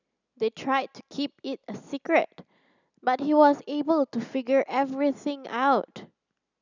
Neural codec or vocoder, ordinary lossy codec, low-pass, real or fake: none; none; 7.2 kHz; real